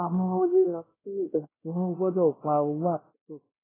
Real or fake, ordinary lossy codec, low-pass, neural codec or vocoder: fake; AAC, 16 kbps; 3.6 kHz; codec, 16 kHz, 0.5 kbps, X-Codec, WavLM features, trained on Multilingual LibriSpeech